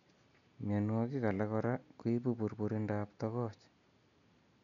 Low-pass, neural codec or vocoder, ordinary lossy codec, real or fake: 7.2 kHz; none; none; real